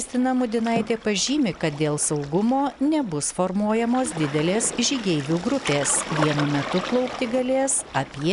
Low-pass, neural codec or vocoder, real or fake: 10.8 kHz; none; real